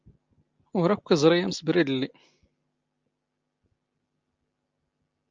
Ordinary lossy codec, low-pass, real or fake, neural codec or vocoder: Opus, 24 kbps; 7.2 kHz; real; none